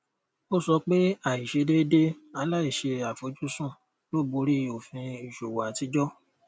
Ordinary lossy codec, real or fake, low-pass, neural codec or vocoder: none; real; none; none